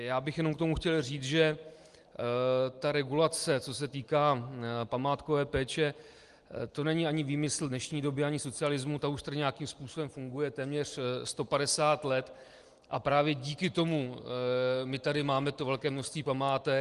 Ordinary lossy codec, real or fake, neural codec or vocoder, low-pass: Opus, 24 kbps; real; none; 10.8 kHz